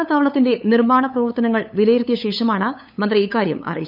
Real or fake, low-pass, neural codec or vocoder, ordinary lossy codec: fake; 5.4 kHz; codec, 16 kHz, 8 kbps, FunCodec, trained on LibriTTS, 25 frames a second; none